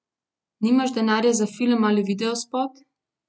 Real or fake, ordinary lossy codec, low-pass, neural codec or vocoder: real; none; none; none